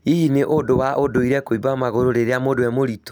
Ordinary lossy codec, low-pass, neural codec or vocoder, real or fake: none; none; none; real